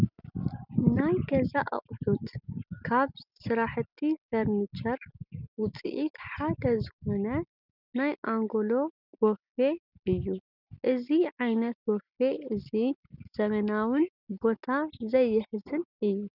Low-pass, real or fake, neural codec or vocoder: 5.4 kHz; real; none